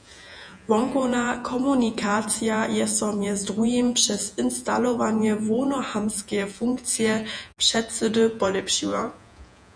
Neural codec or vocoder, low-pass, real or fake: vocoder, 48 kHz, 128 mel bands, Vocos; 9.9 kHz; fake